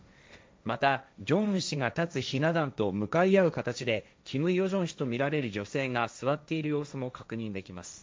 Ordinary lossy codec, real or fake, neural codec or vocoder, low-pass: none; fake; codec, 16 kHz, 1.1 kbps, Voila-Tokenizer; none